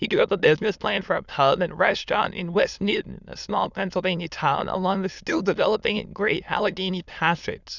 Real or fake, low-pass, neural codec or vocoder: fake; 7.2 kHz; autoencoder, 22.05 kHz, a latent of 192 numbers a frame, VITS, trained on many speakers